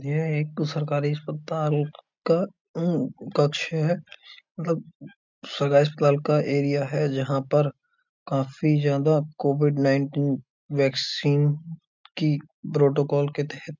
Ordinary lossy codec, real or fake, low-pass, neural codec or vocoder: MP3, 64 kbps; real; 7.2 kHz; none